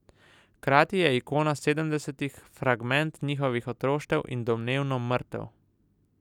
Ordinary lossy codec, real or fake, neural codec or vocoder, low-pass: none; real; none; 19.8 kHz